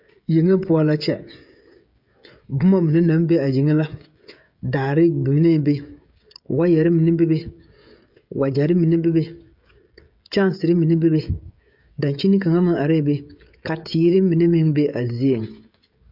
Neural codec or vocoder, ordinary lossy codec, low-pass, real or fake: codec, 16 kHz, 16 kbps, FreqCodec, smaller model; MP3, 48 kbps; 5.4 kHz; fake